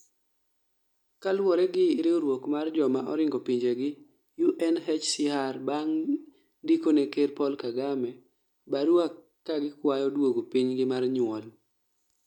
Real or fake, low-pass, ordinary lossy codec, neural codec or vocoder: real; 19.8 kHz; none; none